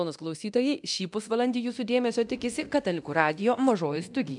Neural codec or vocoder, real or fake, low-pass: codec, 24 kHz, 0.9 kbps, DualCodec; fake; 10.8 kHz